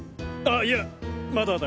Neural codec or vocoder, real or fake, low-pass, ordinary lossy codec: none; real; none; none